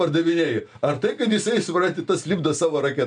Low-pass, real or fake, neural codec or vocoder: 9.9 kHz; real; none